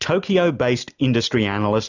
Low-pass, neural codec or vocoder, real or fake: 7.2 kHz; none; real